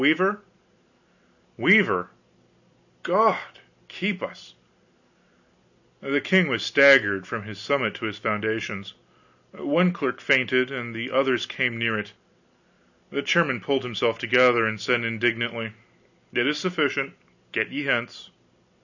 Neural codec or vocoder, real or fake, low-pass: none; real; 7.2 kHz